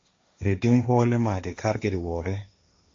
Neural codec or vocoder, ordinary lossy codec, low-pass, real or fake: codec, 16 kHz, 1.1 kbps, Voila-Tokenizer; MP3, 48 kbps; 7.2 kHz; fake